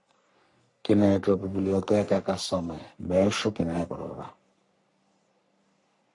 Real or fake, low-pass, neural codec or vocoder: fake; 10.8 kHz; codec, 44.1 kHz, 3.4 kbps, Pupu-Codec